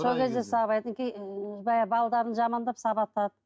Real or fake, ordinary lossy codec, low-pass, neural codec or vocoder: real; none; none; none